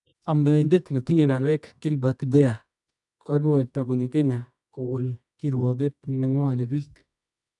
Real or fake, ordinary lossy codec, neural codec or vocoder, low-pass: fake; none; codec, 24 kHz, 0.9 kbps, WavTokenizer, medium music audio release; 10.8 kHz